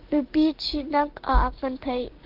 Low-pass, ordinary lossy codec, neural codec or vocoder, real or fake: 5.4 kHz; Opus, 16 kbps; codec, 16 kHz, 6 kbps, DAC; fake